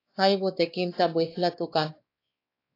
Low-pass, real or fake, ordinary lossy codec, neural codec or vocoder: 5.4 kHz; fake; AAC, 32 kbps; codec, 16 kHz, 2 kbps, X-Codec, WavLM features, trained on Multilingual LibriSpeech